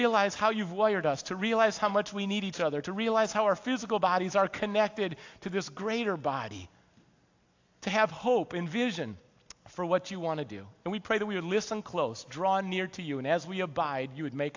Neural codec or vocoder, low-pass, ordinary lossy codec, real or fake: none; 7.2 kHz; AAC, 48 kbps; real